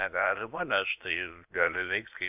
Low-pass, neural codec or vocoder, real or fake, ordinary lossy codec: 3.6 kHz; codec, 16 kHz, about 1 kbps, DyCAST, with the encoder's durations; fake; AAC, 32 kbps